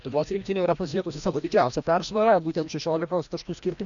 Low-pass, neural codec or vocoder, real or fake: 7.2 kHz; codec, 16 kHz, 1 kbps, FreqCodec, larger model; fake